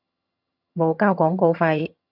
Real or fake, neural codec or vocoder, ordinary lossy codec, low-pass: fake; vocoder, 22.05 kHz, 80 mel bands, HiFi-GAN; AAC, 48 kbps; 5.4 kHz